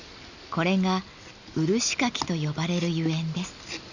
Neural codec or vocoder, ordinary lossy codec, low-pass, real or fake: none; none; 7.2 kHz; real